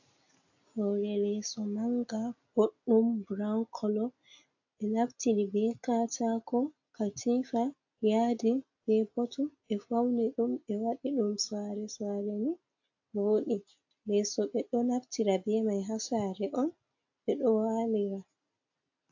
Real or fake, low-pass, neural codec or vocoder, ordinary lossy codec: real; 7.2 kHz; none; AAC, 48 kbps